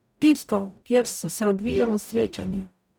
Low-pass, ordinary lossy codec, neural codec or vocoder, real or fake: none; none; codec, 44.1 kHz, 0.9 kbps, DAC; fake